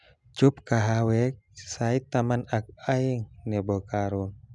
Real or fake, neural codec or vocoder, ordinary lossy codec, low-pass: real; none; none; 10.8 kHz